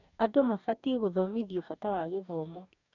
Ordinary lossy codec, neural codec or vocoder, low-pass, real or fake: none; codec, 44.1 kHz, 2.6 kbps, DAC; 7.2 kHz; fake